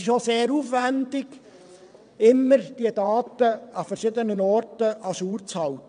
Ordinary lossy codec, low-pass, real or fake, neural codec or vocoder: none; 9.9 kHz; fake; vocoder, 22.05 kHz, 80 mel bands, WaveNeXt